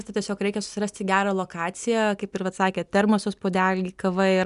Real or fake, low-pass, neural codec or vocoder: real; 10.8 kHz; none